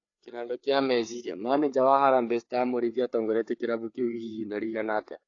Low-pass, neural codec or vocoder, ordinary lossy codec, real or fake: 7.2 kHz; codec, 16 kHz, 4 kbps, FreqCodec, larger model; AAC, 48 kbps; fake